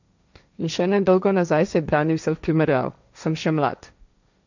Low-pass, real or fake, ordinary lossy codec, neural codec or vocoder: 7.2 kHz; fake; none; codec, 16 kHz, 1.1 kbps, Voila-Tokenizer